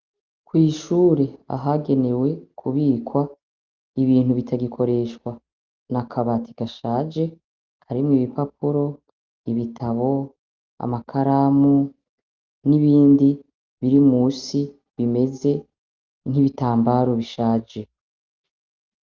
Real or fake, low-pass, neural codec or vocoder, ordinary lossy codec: real; 7.2 kHz; none; Opus, 32 kbps